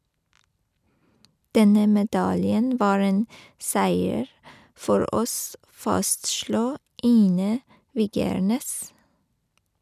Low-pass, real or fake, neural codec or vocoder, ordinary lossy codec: 14.4 kHz; real; none; none